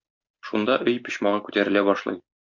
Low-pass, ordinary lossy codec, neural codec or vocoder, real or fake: 7.2 kHz; MP3, 64 kbps; none; real